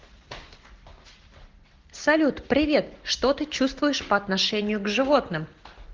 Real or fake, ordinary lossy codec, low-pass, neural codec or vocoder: real; Opus, 16 kbps; 7.2 kHz; none